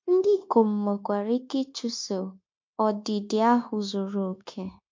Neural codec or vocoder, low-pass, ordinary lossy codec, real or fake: codec, 16 kHz, 0.9 kbps, LongCat-Audio-Codec; 7.2 kHz; MP3, 48 kbps; fake